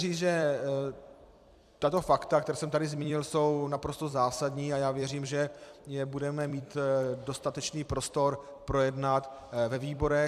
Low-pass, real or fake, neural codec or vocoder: 14.4 kHz; fake; vocoder, 44.1 kHz, 128 mel bands every 256 samples, BigVGAN v2